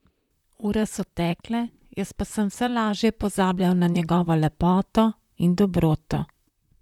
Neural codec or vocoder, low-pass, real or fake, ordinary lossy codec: vocoder, 44.1 kHz, 128 mel bands, Pupu-Vocoder; 19.8 kHz; fake; none